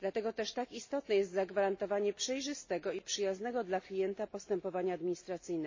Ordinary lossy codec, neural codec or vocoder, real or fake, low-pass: MP3, 32 kbps; none; real; 7.2 kHz